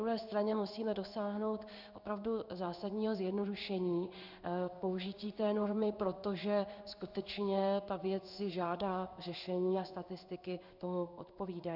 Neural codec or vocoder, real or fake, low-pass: codec, 16 kHz in and 24 kHz out, 1 kbps, XY-Tokenizer; fake; 5.4 kHz